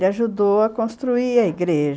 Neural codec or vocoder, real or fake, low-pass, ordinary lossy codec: none; real; none; none